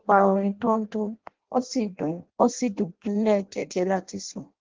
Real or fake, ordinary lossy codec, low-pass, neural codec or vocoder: fake; Opus, 16 kbps; 7.2 kHz; codec, 16 kHz in and 24 kHz out, 0.6 kbps, FireRedTTS-2 codec